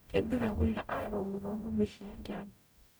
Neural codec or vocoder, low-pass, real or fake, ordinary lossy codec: codec, 44.1 kHz, 0.9 kbps, DAC; none; fake; none